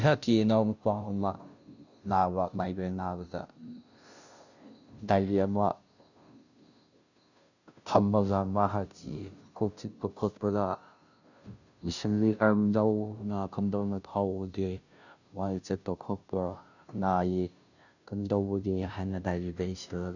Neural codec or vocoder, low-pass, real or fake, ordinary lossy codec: codec, 16 kHz, 0.5 kbps, FunCodec, trained on Chinese and English, 25 frames a second; 7.2 kHz; fake; none